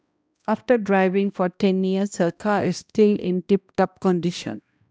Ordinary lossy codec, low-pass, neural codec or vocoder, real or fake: none; none; codec, 16 kHz, 1 kbps, X-Codec, HuBERT features, trained on balanced general audio; fake